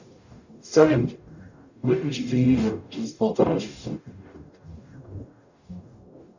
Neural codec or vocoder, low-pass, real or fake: codec, 44.1 kHz, 0.9 kbps, DAC; 7.2 kHz; fake